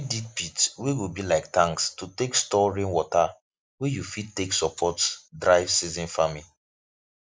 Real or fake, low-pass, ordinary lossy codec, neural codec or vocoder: real; none; none; none